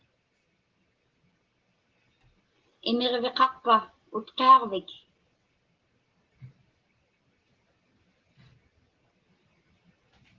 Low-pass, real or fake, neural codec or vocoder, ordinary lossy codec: 7.2 kHz; real; none; Opus, 16 kbps